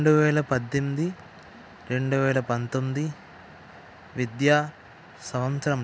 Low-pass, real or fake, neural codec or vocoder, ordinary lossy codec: none; real; none; none